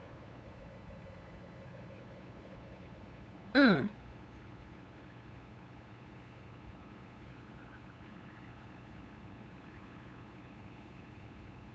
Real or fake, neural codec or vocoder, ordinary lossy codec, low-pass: fake; codec, 16 kHz, 8 kbps, FunCodec, trained on LibriTTS, 25 frames a second; none; none